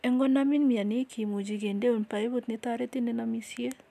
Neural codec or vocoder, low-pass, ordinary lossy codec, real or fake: none; 14.4 kHz; none; real